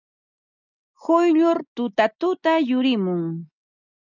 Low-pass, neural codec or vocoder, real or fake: 7.2 kHz; none; real